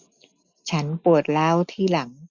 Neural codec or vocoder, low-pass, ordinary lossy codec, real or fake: none; 7.2 kHz; none; real